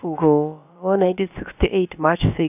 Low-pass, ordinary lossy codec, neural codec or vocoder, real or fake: 3.6 kHz; none; codec, 16 kHz, about 1 kbps, DyCAST, with the encoder's durations; fake